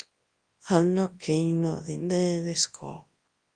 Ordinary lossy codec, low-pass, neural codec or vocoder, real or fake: Opus, 24 kbps; 9.9 kHz; codec, 24 kHz, 0.9 kbps, WavTokenizer, large speech release; fake